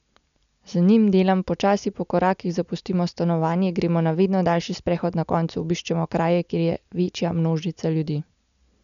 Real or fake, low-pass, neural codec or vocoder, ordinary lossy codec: real; 7.2 kHz; none; none